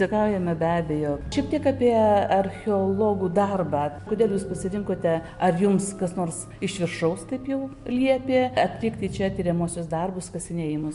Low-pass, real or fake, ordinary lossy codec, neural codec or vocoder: 10.8 kHz; real; MP3, 64 kbps; none